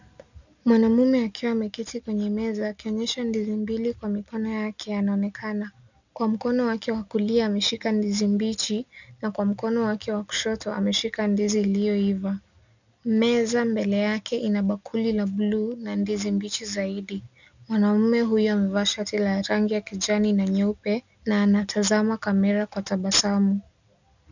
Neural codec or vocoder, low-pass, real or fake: none; 7.2 kHz; real